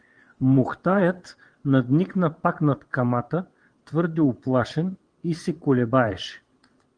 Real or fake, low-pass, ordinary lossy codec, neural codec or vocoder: fake; 9.9 kHz; Opus, 24 kbps; vocoder, 22.05 kHz, 80 mel bands, WaveNeXt